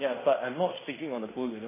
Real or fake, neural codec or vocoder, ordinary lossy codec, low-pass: fake; codec, 16 kHz in and 24 kHz out, 0.9 kbps, LongCat-Audio-Codec, fine tuned four codebook decoder; MP3, 16 kbps; 3.6 kHz